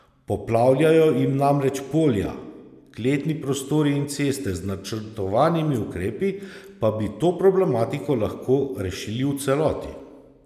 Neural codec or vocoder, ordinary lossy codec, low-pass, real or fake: none; none; 14.4 kHz; real